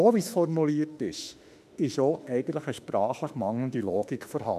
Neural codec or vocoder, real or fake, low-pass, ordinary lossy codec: autoencoder, 48 kHz, 32 numbers a frame, DAC-VAE, trained on Japanese speech; fake; 14.4 kHz; none